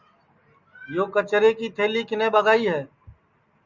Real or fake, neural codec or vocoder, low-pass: real; none; 7.2 kHz